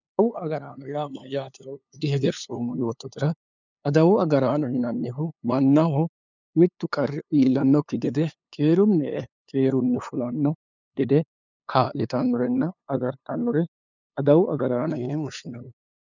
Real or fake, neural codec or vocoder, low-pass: fake; codec, 16 kHz, 2 kbps, FunCodec, trained on LibriTTS, 25 frames a second; 7.2 kHz